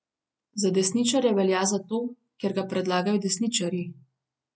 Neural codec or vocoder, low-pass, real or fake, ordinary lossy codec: none; none; real; none